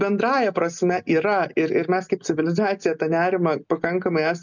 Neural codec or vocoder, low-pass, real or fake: none; 7.2 kHz; real